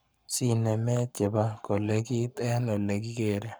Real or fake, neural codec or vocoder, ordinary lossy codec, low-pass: fake; codec, 44.1 kHz, 7.8 kbps, Pupu-Codec; none; none